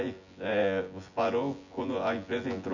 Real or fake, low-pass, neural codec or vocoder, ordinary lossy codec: fake; 7.2 kHz; vocoder, 24 kHz, 100 mel bands, Vocos; none